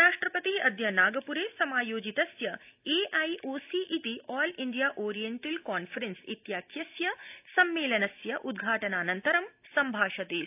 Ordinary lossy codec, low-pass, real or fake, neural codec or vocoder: AAC, 32 kbps; 3.6 kHz; real; none